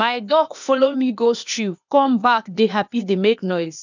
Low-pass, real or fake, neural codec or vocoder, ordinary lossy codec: 7.2 kHz; fake; codec, 16 kHz, 0.8 kbps, ZipCodec; none